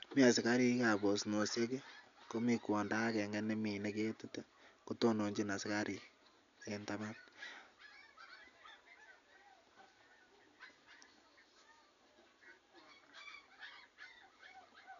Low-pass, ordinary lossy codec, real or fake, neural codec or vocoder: 7.2 kHz; none; real; none